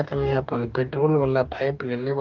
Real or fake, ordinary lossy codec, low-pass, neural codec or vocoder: fake; Opus, 24 kbps; 7.2 kHz; codec, 44.1 kHz, 2.6 kbps, DAC